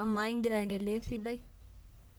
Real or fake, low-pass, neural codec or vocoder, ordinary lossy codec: fake; none; codec, 44.1 kHz, 1.7 kbps, Pupu-Codec; none